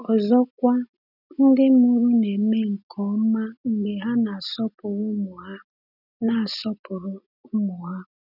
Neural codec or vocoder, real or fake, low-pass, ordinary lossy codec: none; real; 5.4 kHz; none